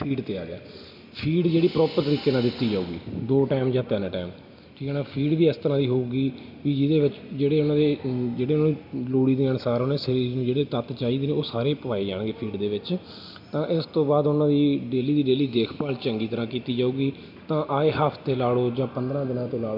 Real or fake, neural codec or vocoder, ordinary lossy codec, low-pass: real; none; none; 5.4 kHz